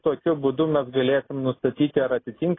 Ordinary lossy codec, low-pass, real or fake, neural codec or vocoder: AAC, 16 kbps; 7.2 kHz; real; none